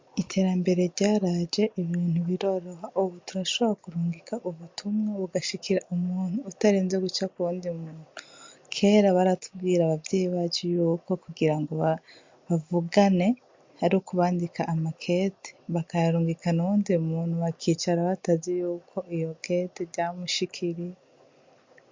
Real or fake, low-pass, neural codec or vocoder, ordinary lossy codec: real; 7.2 kHz; none; MP3, 48 kbps